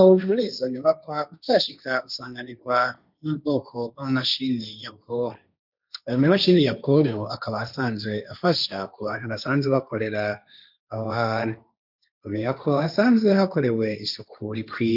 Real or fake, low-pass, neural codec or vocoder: fake; 5.4 kHz; codec, 16 kHz, 1.1 kbps, Voila-Tokenizer